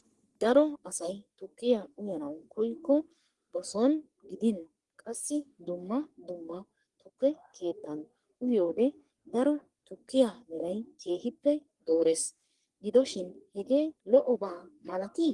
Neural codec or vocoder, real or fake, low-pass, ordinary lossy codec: codec, 44.1 kHz, 3.4 kbps, Pupu-Codec; fake; 10.8 kHz; Opus, 24 kbps